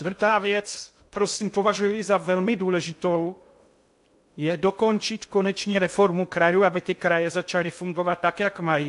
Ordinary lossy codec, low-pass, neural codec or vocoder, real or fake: AAC, 64 kbps; 10.8 kHz; codec, 16 kHz in and 24 kHz out, 0.6 kbps, FocalCodec, streaming, 2048 codes; fake